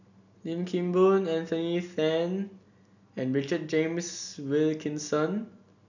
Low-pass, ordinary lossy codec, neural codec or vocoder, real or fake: 7.2 kHz; none; none; real